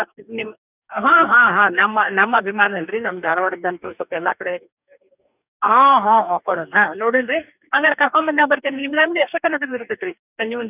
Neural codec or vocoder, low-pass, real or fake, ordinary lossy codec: codec, 44.1 kHz, 2.6 kbps, DAC; 3.6 kHz; fake; none